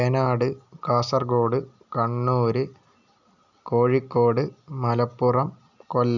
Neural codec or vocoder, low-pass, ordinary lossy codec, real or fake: none; 7.2 kHz; none; real